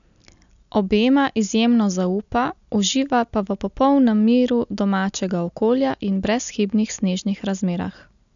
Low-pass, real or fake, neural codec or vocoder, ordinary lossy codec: 7.2 kHz; real; none; none